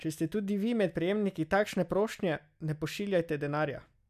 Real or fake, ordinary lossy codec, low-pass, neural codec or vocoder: real; none; 14.4 kHz; none